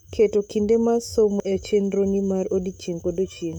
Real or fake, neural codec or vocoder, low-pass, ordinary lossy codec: fake; autoencoder, 48 kHz, 128 numbers a frame, DAC-VAE, trained on Japanese speech; 19.8 kHz; none